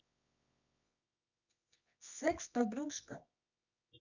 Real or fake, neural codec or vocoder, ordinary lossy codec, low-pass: fake; codec, 24 kHz, 0.9 kbps, WavTokenizer, medium music audio release; none; 7.2 kHz